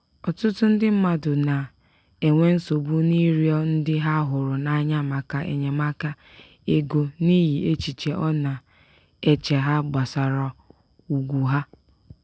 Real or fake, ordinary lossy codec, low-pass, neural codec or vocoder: real; none; none; none